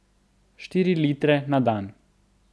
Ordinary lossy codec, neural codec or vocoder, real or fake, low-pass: none; none; real; none